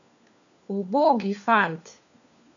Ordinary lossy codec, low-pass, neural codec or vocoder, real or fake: none; 7.2 kHz; codec, 16 kHz, 4 kbps, FunCodec, trained on LibriTTS, 50 frames a second; fake